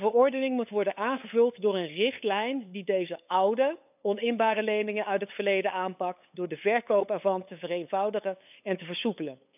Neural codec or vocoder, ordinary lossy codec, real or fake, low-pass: codec, 16 kHz, 8 kbps, FunCodec, trained on LibriTTS, 25 frames a second; none; fake; 3.6 kHz